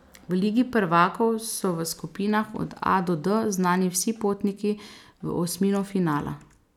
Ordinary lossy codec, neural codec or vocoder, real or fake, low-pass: none; none; real; 19.8 kHz